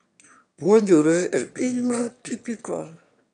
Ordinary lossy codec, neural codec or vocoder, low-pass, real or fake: none; autoencoder, 22.05 kHz, a latent of 192 numbers a frame, VITS, trained on one speaker; 9.9 kHz; fake